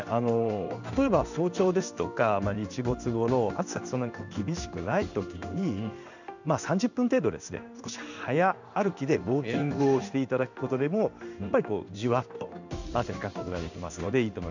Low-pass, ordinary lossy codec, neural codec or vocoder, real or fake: 7.2 kHz; none; codec, 16 kHz in and 24 kHz out, 1 kbps, XY-Tokenizer; fake